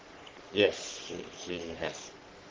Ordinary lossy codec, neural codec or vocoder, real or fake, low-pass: Opus, 16 kbps; codec, 44.1 kHz, 3.4 kbps, Pupu-Codec; fake; 7.2 kHz